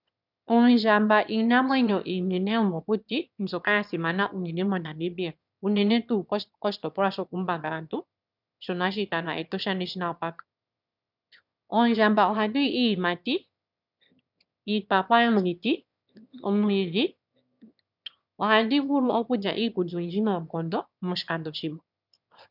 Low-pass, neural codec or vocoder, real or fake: 5.4 kHz; autoencoder, 22.05 kHz, a latent of 192 numbers a frame, VITS, trained on one speaker; fake